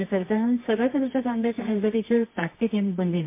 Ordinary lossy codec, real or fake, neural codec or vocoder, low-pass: MP3, 24 kbps; fake; codec, 24 kHz, 0.9 kbps, WavTokenizer, medium music audio release; 3.6 kHz